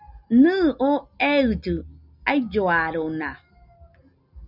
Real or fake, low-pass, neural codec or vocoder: real; 5.4 kHz; none